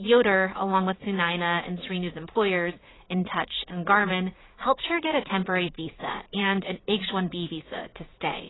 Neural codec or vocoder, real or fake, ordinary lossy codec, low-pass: vocoder, 44.1 kHz, 80 mel bands, Vocos; fake; AAC, 16 kbps; 7.2 kHz